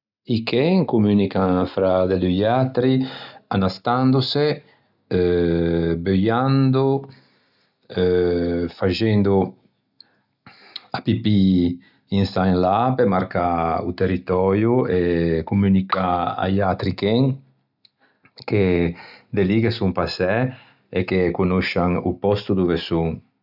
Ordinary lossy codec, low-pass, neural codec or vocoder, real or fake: none; 5.4 kHz; none; real